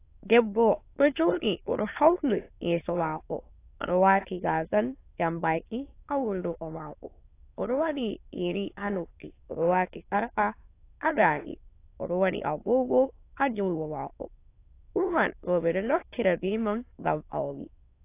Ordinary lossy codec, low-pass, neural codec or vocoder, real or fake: AAC, 24 kbps; 3.6 kHz; autoencoder, 22.05 kHz, a latent of 192 numbers a frame, VITS, trained on many speakers; fake